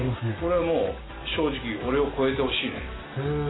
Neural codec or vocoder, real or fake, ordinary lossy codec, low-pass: none; real; AAC, 16 kbps; 7.2 kHz